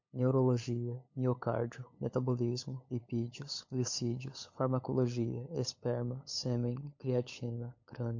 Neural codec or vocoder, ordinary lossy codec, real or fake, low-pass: codec, 16 kHz, 8 kbps, FunCodec, trained on LibriTTS, 25 frames a second; MP3, 48 kbps; fake; 7.2 kHz